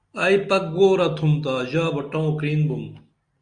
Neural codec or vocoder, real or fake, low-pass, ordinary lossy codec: none; real; 9.9 kHz; Opus, 64 kbps